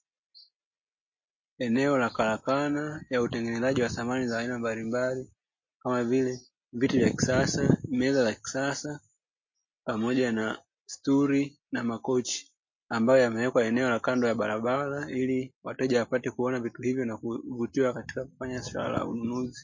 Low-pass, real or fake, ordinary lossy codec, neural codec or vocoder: 7.2 kHz; real; MP3, 32 kbps; none